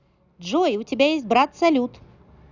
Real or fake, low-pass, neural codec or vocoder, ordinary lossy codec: real; 7.2 kHz; none; none